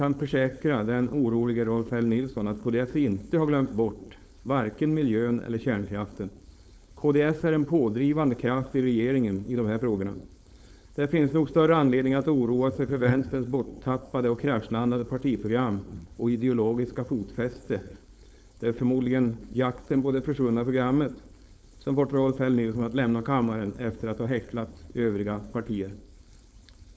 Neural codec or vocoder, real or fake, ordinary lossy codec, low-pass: codec, 16 kHz, 4.8 kbps, FACodec; fake; none; none